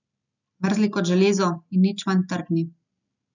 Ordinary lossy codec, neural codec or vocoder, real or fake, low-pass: none; none; real; 7.2 kHz